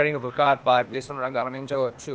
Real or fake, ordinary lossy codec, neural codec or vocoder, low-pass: fake; none; codec, 16 kHz, 0.8 kbps, ZipCodec; none